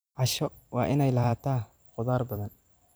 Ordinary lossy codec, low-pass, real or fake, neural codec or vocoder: none; none; fake; vocoder, 44.1 kHz, 128 mel bands every 256 samples, BigVGAN v2